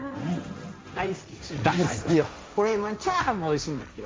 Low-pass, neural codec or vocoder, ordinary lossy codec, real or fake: none; codec, 16 kHz, 1.1 kbps, Voila-Tokenizer; none; fake